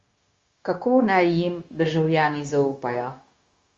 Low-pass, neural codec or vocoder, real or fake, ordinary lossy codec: 7.2 kHz; codec, 16 kHz, 0.9 kbps, LongCat-Audio-Codec; fake; Opus, 32 kbps